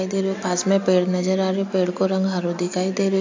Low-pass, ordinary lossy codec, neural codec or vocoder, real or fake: 7.2 kHz; AAC, 48 kbps; none; real